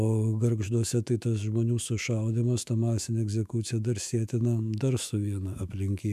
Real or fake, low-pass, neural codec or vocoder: fake; 14.4 kHz; autoencoder, 48 kHz, 128 numbers a frame, DAC-VAE, trained on Japanese speech